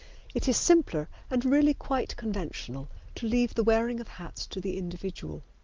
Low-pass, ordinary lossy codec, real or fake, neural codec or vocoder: 7.2 kHz; Opus, 32 kbps; real; none